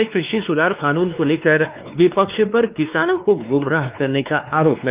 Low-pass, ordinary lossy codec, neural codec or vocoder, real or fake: 3.6 kHz; Opus, 32 kbps; codec, 16 kHz, 2 kbps, X-Codec, WavLM features, trained on Multilingual LibriSpeech; fake